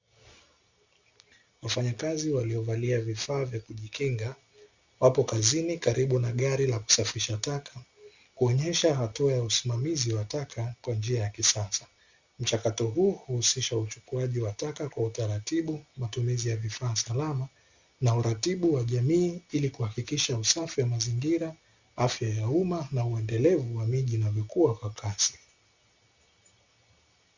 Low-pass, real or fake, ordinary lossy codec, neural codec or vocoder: 7.2 kHz; real; Opus, 64 kbps; none